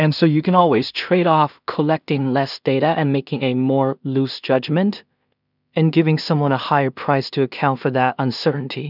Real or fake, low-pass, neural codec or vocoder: fake; 5.4 kHz; codec, 16 kHz in and 24 kHz out, 0.4 kbps, LongCat-Audio-Codec, two codebook decoder